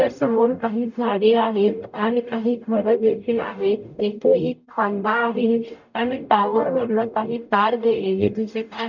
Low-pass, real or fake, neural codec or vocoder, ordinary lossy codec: 7.2 kHz; fake; codec, 44.1 kHz, 0.9 kbps, DAC; none